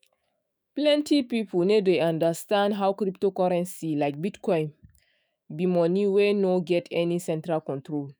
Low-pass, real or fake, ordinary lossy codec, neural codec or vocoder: none; fake; none; autoencoder, 48 kHz, 128 numbers a frame, DAC-VAE, trained on Japanese speech